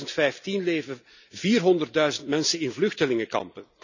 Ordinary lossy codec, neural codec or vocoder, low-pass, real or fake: none; none; 7.2 kHz; real